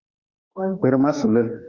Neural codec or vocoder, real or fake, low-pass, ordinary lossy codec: autoencoder, 48 kHz, 32 numbers a frame, DAC-VAE, trained on Japanese speech; fake; 7.2 kHz; Opus, 64 kbps